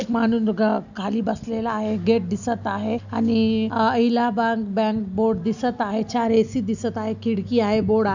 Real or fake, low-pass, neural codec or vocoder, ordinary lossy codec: real; 7.2 kHz; none; none